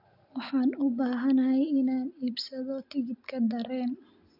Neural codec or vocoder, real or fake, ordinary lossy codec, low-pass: none; real; none; 5.4 kHz